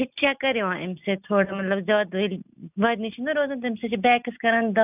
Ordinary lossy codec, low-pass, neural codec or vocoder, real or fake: none; 3.6 kHz; none; real